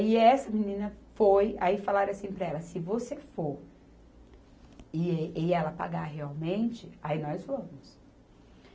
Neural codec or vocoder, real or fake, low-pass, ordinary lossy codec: none; real; none; none